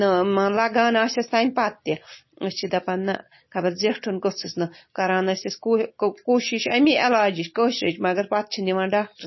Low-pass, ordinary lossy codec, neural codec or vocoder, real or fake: 7.2 kHz; MP3, 24 kbps; none; real